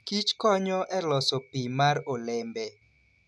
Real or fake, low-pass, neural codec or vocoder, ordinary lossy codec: real; none; none; none